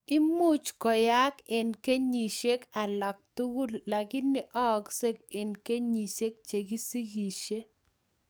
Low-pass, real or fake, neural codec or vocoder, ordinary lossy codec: none; fake; codec, 44.1 kHz, 7.8 kbps, DAC; none